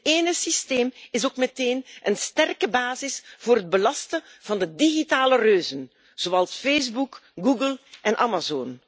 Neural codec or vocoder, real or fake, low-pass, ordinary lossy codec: none; real; none; none